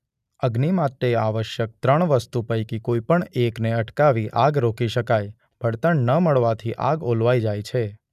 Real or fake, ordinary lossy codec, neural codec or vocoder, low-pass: real; none; none; 14.4 kHz